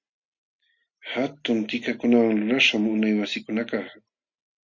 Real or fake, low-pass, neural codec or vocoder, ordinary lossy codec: real; 7.2 kHz; none; Opus, 64 kbps